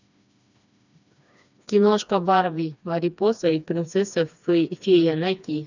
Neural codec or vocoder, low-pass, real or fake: codec, 16 kHz, 2 kbps, FreqCodec, smaller model; 7.2 kHz; fake